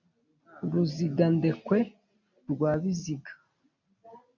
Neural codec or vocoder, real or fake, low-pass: none; real; 7.2 kHz